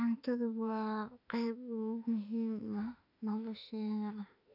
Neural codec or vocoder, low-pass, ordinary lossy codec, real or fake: autoencoder, 48 kHz, 32 numbers a frame, DAC-VAE, trained on Japanese speech; 5.4 kHz; none; fake